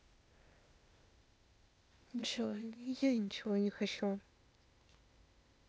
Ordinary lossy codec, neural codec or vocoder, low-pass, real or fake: none; codec, 16 kHz, 0.8 kbps, ZipCodec; none; fake